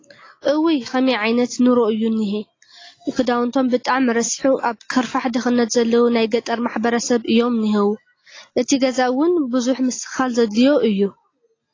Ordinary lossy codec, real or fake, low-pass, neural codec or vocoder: AAC, 32 kbps; real; 7.2 kHz; none